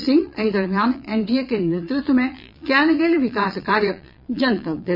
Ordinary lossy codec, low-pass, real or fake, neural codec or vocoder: none; 5.4 kHz; fake; vocoder, 22.05 kHz, 80 mel bands, Vocos